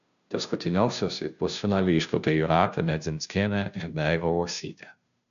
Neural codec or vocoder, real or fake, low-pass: codec, 16 kHz, 0.5 kbps, FunCodec, trained on Chinese and English, 25 frames a second; fake; 7.2 kHz